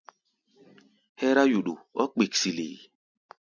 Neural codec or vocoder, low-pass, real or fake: none; 7.2 kHz; real